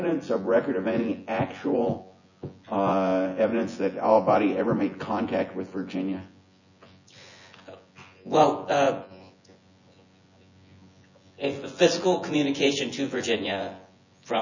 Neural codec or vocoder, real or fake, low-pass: vocoder, 24 kHz, 100 mel bands, Vocos; fake; 7.2 kHz